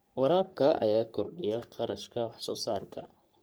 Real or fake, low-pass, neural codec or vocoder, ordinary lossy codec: fake; none; codec, 44.1 kHz, 3.4 kbps, Pupu-Codec; none